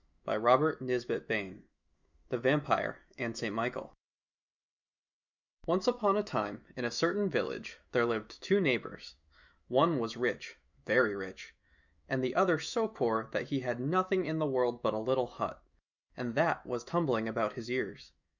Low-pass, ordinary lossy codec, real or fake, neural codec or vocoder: 7.2 kHz; Opus, 64 kbps; fake; autoencoder, 48 kHz, 128 numbers a frame, DAC-VAE, trained on Japanese speech